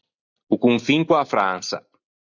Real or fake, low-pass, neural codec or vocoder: real; 7.2 kHz; none